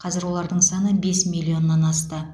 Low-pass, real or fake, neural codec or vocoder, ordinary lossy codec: none; real; none; none